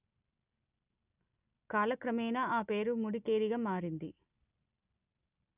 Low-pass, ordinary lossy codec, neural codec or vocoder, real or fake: 3.6 kHz; none; none; real